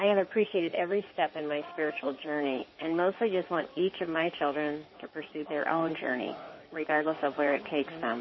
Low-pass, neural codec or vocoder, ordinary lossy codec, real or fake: 7.2 kHz; codec, 16 kHz in and 24 kHz out, 2.2 kbps, FireRedTTS-2 codec; MP3, 24 kbps; fake